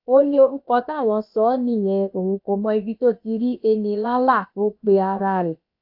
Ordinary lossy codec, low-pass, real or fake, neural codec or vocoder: AAC, 48 kbps; 5.4 kHz; fake; codec, 16 kHz, about 1 kbps, DyCAST, with the encoder's durations